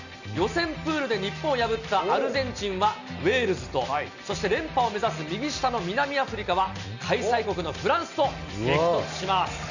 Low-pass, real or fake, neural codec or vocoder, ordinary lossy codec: 7.2 kHz; real; none; none